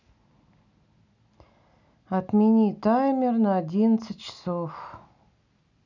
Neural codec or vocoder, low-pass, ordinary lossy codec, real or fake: none; 7.2 kHz; none; real